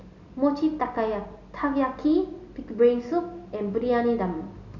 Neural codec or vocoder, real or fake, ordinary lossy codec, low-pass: none; real; none; 7.2 kHz